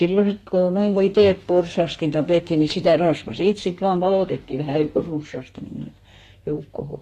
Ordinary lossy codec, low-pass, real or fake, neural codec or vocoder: AAC, 48 kbps; 14.4 kHz; fake; codec, 32 kHz, 1.9 kbps, SNAC